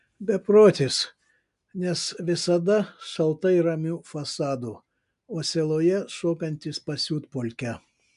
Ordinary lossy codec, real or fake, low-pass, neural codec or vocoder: MP3, 96 kbps; real; 10.8 kHz; none